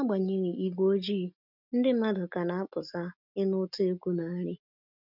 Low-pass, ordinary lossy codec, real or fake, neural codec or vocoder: 5.4 kHz; none; real; none